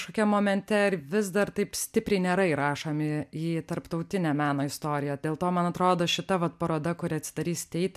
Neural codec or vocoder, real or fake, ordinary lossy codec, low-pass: none; real; MP3, 96 kbps; 14.4 kHz